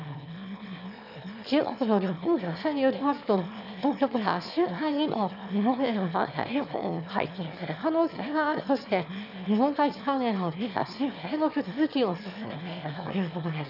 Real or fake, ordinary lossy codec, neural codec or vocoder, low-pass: fake; none; autoencoder, 22.05 kHz, a latent of 192 numbers a frame, VITS, trained on one speaker; 5.4 kHz